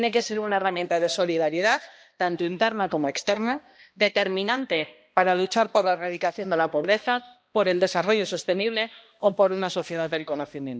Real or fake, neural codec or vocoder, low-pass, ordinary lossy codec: fake; codec, 16 kHz, 1 kbps, X-Codec, HuBERT features, trained on balanced general audio; none; none